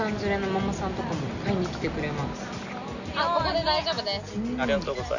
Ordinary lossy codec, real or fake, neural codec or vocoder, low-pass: none; real; none; 7.2 kHz